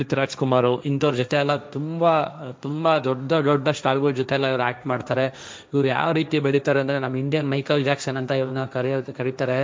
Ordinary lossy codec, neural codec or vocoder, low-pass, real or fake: none; codec, 16 kHz, 1.1 kbps, Voila-Tokenizer; none; fake